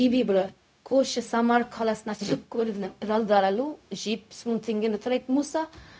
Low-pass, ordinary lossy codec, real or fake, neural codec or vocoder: none; none; fake; codec, 16 kHz, 0.4 kbps, LongCat-Audio-Codec